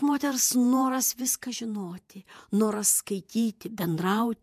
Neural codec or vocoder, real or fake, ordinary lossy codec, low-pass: vocoder, 48 kHz, 128 mel bands, Vocos; fake; MP3, 96 kbps; 14.4 kHz